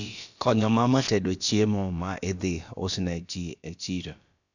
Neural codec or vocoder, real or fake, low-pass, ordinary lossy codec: codec, 16 kHz, about 1 kbps, DyCAST, with the encoder's durations; fake; 7.2 kHz; none